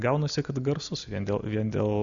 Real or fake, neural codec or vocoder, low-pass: real; none; 7.2 kHz